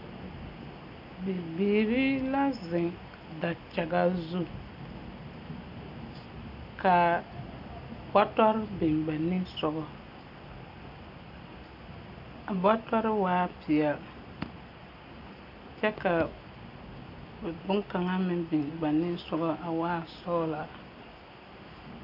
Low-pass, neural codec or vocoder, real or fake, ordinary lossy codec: 5.4 kHz; none; real; AAC, 48 kbps